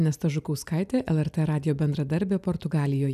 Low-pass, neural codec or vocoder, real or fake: 14.4 kHz; none; real